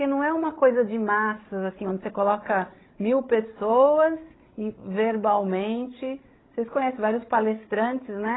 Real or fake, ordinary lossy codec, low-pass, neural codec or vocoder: fake; AAC, 16 kbps; 7.2 kHz; codec, 16 kHz, 16 kbps, FreqCodec, larger model